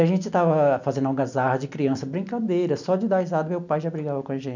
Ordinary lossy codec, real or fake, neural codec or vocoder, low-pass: none; real; none; 7.2 kHz